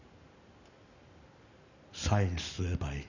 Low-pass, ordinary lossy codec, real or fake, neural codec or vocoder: 7.2 kHz; none; real; none